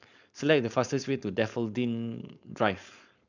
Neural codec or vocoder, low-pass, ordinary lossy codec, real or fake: codec, 16 kHz, 4.8 kbps, FACodec; 7.2 kHz; none; fake